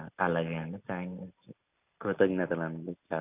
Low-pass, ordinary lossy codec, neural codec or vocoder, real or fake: 3.6 kHz; none; none; real